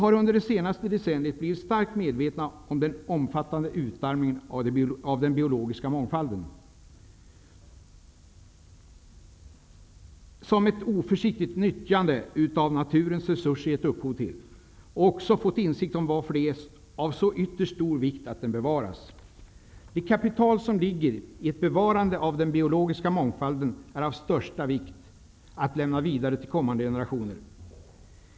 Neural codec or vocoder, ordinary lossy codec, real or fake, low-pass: none; none; real; none